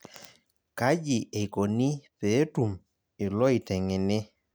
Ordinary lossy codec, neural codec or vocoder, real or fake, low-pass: none; none; real; none